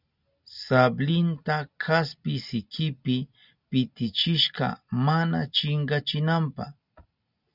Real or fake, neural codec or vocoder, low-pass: real; none; 5.4 kHz